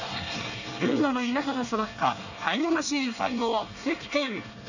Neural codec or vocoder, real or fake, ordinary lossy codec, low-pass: codec, 24 kHz, 1 kbps, SNAC; fake; none; 7.2 kHz